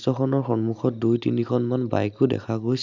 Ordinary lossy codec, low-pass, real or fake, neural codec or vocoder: none; 7.2 kHz; real; none